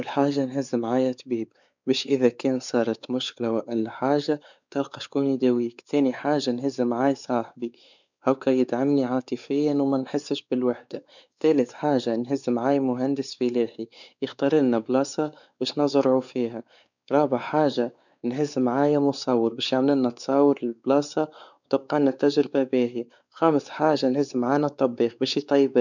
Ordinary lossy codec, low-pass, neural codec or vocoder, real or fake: none; 7.2 kHz; codec, 16 kHz, 4 kbps, X-Codec, WavLM features, trained on Multilingual LibriSpeech; fake